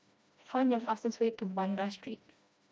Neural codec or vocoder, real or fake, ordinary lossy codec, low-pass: codec, 16 kHz, 1 kbps, FreqCodec, smaller model; fake; none; none